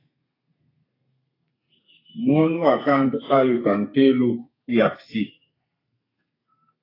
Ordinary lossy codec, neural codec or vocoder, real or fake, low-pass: AAC, 24 kbps; codec, 32 kHz, 1.9 kbps, SNAC; fake; 5.4 kHz